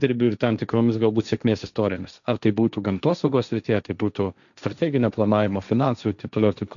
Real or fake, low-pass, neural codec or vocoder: fake; 7.2 kHz; codec, 16 kHz, 1.1 kbps, Voila-Tokenizer